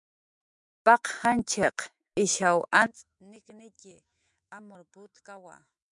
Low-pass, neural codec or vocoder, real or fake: 10.8 kHz; autoencoder, 48 kHz, 128 numbers a frame, DAC-VAE, trained on Japanese speech; fake